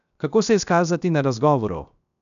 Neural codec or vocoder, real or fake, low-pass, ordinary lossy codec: codec, 16 kHz, 0.7 kbps, FocalCodec; fake; 7.2 kHz; none